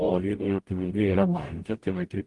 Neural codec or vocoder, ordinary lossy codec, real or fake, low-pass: codec, 44.1 kHz, 0.9 kbps, DAC; Opus, 24 kbps; fake; 10.8 kHz